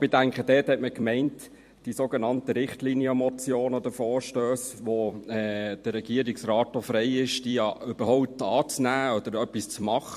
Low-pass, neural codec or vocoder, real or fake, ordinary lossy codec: 14.4 kHz; vocoder, 44.1 kHz, 128 mel bands every 256 samples, BigVGAN v2; fake; MP3, 64 kbps